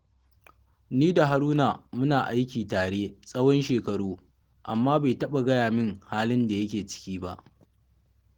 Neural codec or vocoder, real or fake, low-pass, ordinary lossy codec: none; real; 19.8 kHz; Opus, 16 kbps